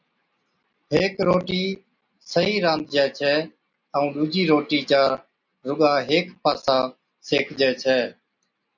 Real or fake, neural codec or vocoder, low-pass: real; none; 7.2 kHz